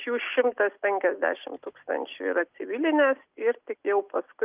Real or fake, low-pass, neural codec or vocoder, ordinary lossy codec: real; 3.6 kHz; none; Opus, 24 kbps